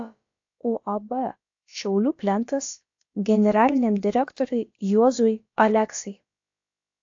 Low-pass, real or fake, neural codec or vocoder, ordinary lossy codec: 7.2 kHz; fake; codec, 16 kHz, about 1 kbps, DyCAST, with the encoder's durations; AAC, 48 kbps